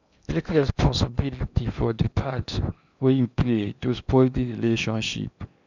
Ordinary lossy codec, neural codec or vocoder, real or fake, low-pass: none; codec, 16 kHz in and 24 kHz out, 0.8 kbps, FocalCodec, streaming, 65536 codes; fake; 7.2 kHz